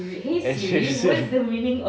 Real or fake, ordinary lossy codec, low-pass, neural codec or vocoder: real; none; none; none